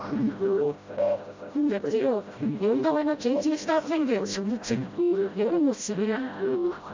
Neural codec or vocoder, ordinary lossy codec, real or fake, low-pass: codec, 16 kHz, 0.5 kbps, FreqCodec, smaller model; MP3, 64 kbps; fake; 7.2 kHz